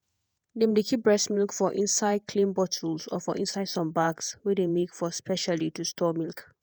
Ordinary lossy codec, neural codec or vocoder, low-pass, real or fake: none; vocoder, 48 kHz, 128 mel bands, Vocos; none; fake